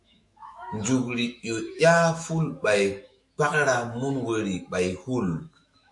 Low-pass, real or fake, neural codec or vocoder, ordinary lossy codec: 10.8 kHz; fake; autoencoder, 48 kHz, 128 numbers a frame, DAC-VAE, trained on Japanese speech; MP3, 48 kbps